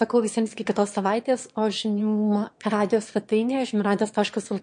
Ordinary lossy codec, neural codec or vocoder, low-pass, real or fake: MP3, 48 kbps; autoencoder, 22.05 kHz, a latent of 192 numbers a frame, VITS, trained on one speaker; 9.9 kHz; fake